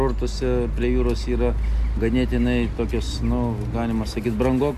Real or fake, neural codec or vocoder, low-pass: real; none; 14.4 kHz